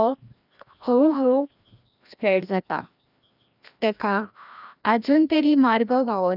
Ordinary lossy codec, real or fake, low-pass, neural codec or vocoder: none; fake; 5.4 kHz; codec, 16 kHz, 1 kbps, FreqCodec, larger model